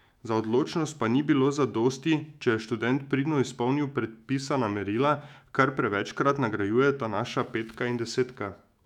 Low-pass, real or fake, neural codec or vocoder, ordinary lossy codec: 19.8 kHz; fake; autoencoder, 48 kHz, 128 numbers a frame, DAC-VAE, trained on Japanese speech; none